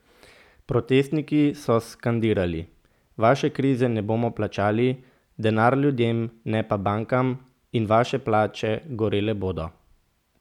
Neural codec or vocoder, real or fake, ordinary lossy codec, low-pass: none; real; none; 19.8 kHz